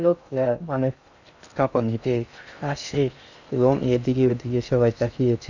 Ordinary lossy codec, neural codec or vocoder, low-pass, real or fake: none; codec, 16 kHz in and 24 kHz out, 0.6 kbps, FocalCodec, streaming, 2048 codes; 7.2 kHz; fake